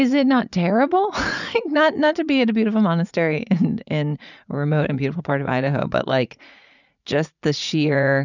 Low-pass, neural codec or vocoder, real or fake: 7.2 kHz; vocoder, 44.1 kHz, 80 mel bands, Vocos; fake